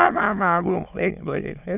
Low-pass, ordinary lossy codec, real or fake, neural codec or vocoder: 3.6 kHz; none; fake; autoencoder, 22.05 kHz, a latent of 192 numbers a frame, VITS, trained on many speakers